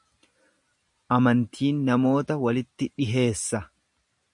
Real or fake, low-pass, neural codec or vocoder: real; 10.8 kHz; none